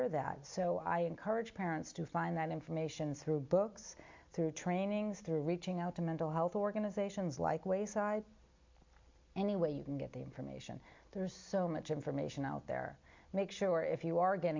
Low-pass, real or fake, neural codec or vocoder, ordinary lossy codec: 7.2 kHz; real; none; MP3, 64 kbps